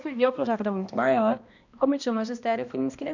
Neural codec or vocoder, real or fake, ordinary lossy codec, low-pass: codec, 16 kHz, 1 kbps, X-Codec, HuBERT features, trained on balanced general audio; fake; none; 7.2 kHz